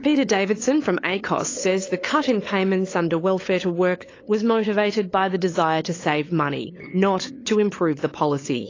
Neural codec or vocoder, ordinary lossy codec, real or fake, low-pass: codec, 16 kHz, 8 kbps, FunCodec, trained on LibriTTS, 25 frames a second; AAC, 32 kbps; fake; 7.2 kHz